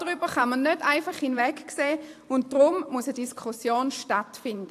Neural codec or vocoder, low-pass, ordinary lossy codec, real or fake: vocoder, 44.1 kHz, 128 mel bands every 512 samples, BigVGAN v2; 14.4 kHz; AAC, 64 kbps; fake